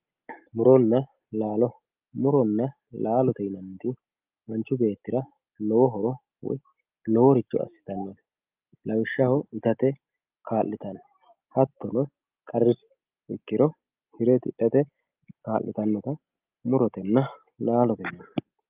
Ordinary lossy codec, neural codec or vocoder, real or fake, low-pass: Opus, 32 kbps; none; real; 3.6 kHz